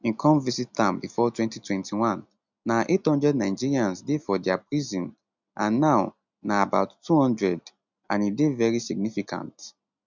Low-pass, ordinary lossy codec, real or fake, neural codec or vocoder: 7.2 kHz; none; real; none